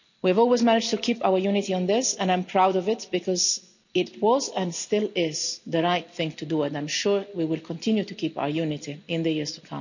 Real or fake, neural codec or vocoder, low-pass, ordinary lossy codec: real; none; 7.2 kHz; none